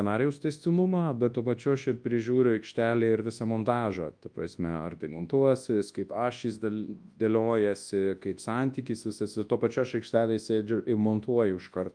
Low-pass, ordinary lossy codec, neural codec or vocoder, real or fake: 9.9 kHz; Opus, 32 kbps; codec, 24 kHz, 0.9 kbps, WavTokenizer, large speech release; fake